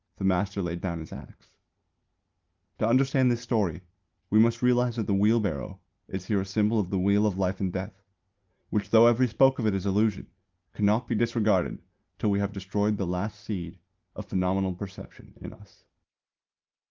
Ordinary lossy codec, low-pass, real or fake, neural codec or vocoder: Opus, 24 kbps; 7.2 kHz; real; none